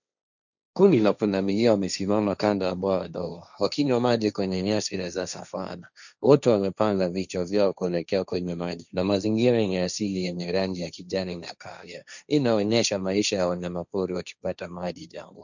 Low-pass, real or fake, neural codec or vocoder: 7.2 kHz; fake; codec, 16 kHz, 1.1 kbps, Voila-Tokenizer